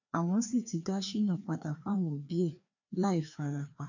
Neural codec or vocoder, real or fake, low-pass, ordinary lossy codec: codec, 16 kHz, 2 kbps, FreqCodec, larger model; fake; 7.2 kHz; none